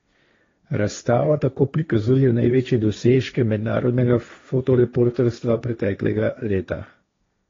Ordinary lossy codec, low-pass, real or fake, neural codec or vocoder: AAC, 32 kbps; 7.2 kHz; fake; codec, 16 kHz, 1.1 kbps, Voila-Tokenizer